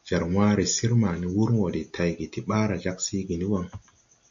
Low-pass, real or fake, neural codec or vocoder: 7.2 kHz; real; none